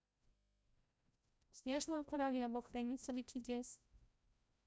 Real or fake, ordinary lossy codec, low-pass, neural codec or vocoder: fake; none; none; codec, 16 kHz, 0.5 kbps, FreqCodec, larger model